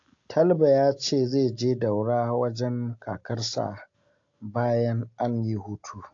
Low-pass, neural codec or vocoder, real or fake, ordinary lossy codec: 7.2 kHz; none; real; AAC, 48 kbps